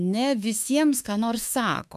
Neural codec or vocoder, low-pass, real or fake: autoencoder, 48 kHz, 32 numbers a frame, DAC-VAE, trained on Japanese speech; 14.4 kHz; fake